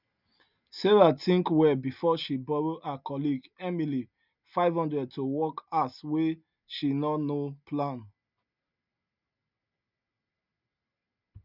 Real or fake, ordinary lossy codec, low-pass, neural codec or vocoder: real; none; 5.4 kHz; none